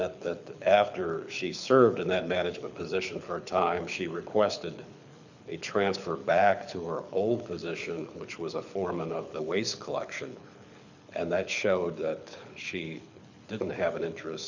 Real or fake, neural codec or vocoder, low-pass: fake; codec, 24 kHz, 6 kbps, HILCodec; 7.2 kHz